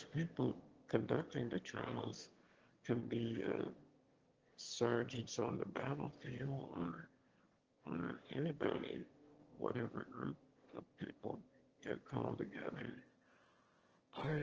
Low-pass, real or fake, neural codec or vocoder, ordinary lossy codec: 7.2 kHz; fake; autoencoder, 22.05 kHz, a latent of 192 numbers a frame, VITS, trained on one speaker; Opus, 16 kbps